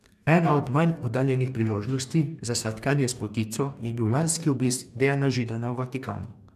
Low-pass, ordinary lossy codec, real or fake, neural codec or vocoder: 14.4 kHz; none; fake; codec, 44.1 kHz, 2.6 kbps, DAC